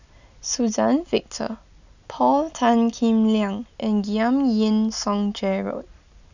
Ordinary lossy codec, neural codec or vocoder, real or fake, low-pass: none; none; real; 7.2 kHz